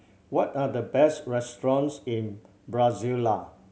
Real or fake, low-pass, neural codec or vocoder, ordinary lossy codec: real; none; none; none